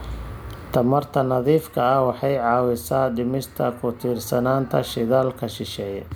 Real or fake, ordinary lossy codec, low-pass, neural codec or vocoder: real; none; none; none